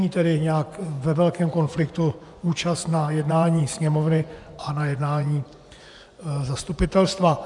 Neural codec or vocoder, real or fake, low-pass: vocoder, 24 kHz, 100 mel bands, Vocos; fake; 10.8 kHz